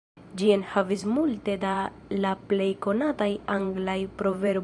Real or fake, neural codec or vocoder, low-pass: fake; vocoder, 44.1 kHz, 128 mel bands every 512 samples, BigVGAN v2; 10.8 kHz